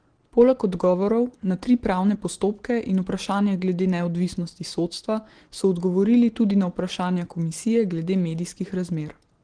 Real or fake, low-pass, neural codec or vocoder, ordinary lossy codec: real; 9.9 kHz; none; Opus, 16 kbps